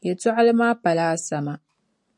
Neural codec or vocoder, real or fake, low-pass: none; real; 10.8 kHz